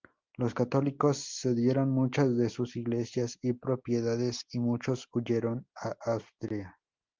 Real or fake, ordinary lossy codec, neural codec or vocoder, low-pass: real; Opus, 32 kbps; none; 7.2 kHz